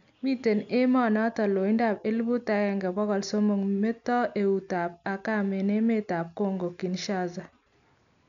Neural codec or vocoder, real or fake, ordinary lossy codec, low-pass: none; real; none; 7.2 kHz